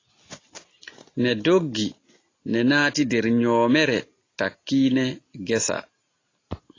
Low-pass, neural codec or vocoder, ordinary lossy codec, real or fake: 7.2 kHz; none; AAC, 32 kbps; real